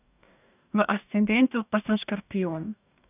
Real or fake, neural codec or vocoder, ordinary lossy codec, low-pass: fake; codec, 44.1 kHz, 2.6 kbps, DAC; none; 3.6 kHz